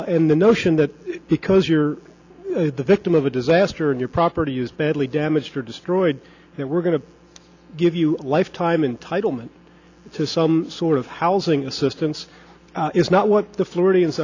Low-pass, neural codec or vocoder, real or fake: 7.2 kHz; none; real